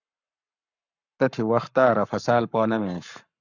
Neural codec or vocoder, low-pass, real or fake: codec, 44.1 kHz, 7.8 kbps, Pupu-Codec; 7.2 kHz; fake